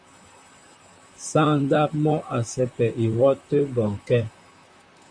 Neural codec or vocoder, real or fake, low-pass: vocoder, 44.1 kHz, 128 mel bands, Pupu-Vocoder; fake; 9.9 kHz